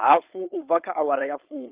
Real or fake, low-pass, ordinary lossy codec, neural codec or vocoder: fake; 3.6 kHz; Opus, 32 kbps; codec, 16 kHz, 4.8 kbps, FACodec